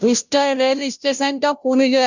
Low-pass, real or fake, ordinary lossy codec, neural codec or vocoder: 7.2 kHz; fake; none; codec, 16 kHz, 0.5 kbps, FunCodec, trained on Chinese and English, 25 frames a second